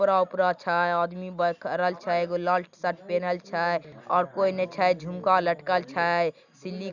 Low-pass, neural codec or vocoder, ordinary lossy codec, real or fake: 7.2 kHz; none; none; real